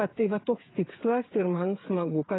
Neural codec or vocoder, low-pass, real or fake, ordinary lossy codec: codec, 16 kHz, 8 kbps, FreqCodec, smaller model; 7.2 kHz; fake; AAC, 16 kbps